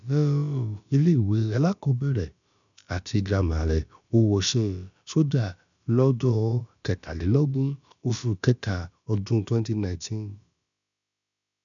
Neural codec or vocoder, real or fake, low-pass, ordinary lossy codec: codec, 16 kHz, about 1 kbps, DyCAST, with the encoder's durations; fake; 7.2 kHz; none